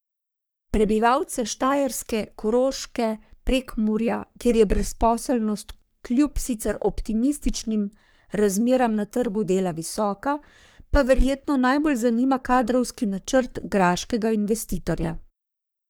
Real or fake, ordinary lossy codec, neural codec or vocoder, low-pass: fake; none; codec, 44.1 kHz, 3.4 kbps, Pupu-Codec; none